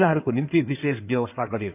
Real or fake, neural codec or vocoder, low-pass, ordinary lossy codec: fake; codec, 16 kHz in and 24 kHz out, 2.2 kbps, FireRedTTS-2 codec; 3.6 kHz; none